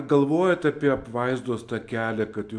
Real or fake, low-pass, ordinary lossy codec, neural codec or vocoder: real; 9.9 kHz; AAC, 64 kbps; none